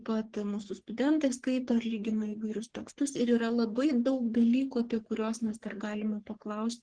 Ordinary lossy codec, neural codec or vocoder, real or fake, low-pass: Opus, 16 kbps; codec, 44.1 kHz, 3.4 kbps, Pupu-Codec; fake; 9.9 kHz